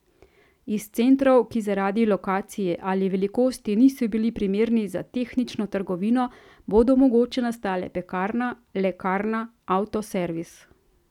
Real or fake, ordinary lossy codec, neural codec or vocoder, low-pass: real; none; none; 19.8 kHz